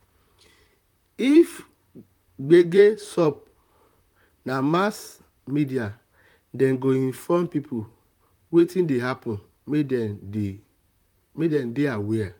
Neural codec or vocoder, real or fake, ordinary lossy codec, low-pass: vocoder, 44.1 kHz, 128 mel bands, Pupu-Vocoder; fake; none; 19.8 kHz